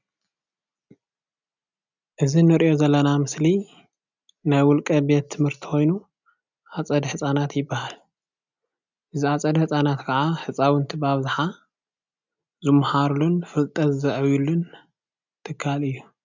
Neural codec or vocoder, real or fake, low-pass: none; real; 7.2 kHz